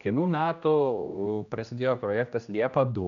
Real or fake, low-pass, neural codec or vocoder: fake; 7.2 kHz; codec, 16 kHz, 1 kbps, X-Codec, HuBERT features, trained on general audio